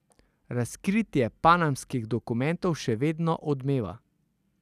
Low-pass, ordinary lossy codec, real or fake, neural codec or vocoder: 14.4 kHz; none; real; none